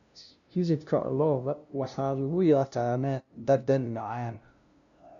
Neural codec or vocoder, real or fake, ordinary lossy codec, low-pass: codec, 16 kHz, 0.5 kbps, FunCodec, trained on LibriTTS, 25 frames a second; fake; none; 7.2 kHz